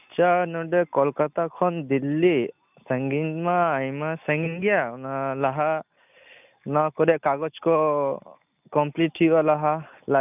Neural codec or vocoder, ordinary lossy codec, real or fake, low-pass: none; none; real; 3.6 kHz